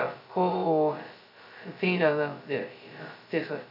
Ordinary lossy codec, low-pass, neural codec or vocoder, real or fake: none; 5.4 kHz; codec, 16 kHz, 0.2 kbps, FocalCodec; fake